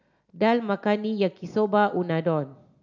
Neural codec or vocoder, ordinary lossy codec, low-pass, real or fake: none; none; 7.2 kHz; real